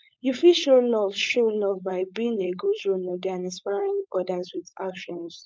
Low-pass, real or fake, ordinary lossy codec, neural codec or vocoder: none; fake; none; codec, 16 kHz, 4.8 kbps, FACodec